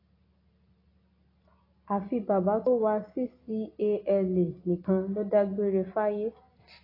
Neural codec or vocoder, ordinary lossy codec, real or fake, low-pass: none; AAC, 24 kbps; real; 5.4 kHz